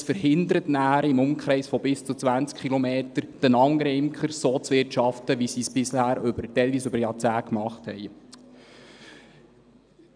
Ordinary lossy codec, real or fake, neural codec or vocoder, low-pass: none; real; none; 9.9 kHz